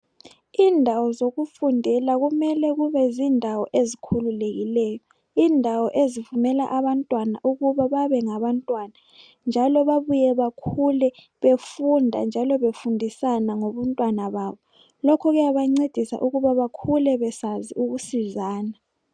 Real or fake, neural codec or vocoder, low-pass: real; none; 9.9 kHz